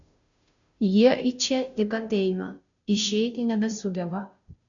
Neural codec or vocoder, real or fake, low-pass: codec, 16 kHz, 0.5 kbps, FunCodec, trained on Chinese and English, 25 frames a second; fake; 7.2 kHz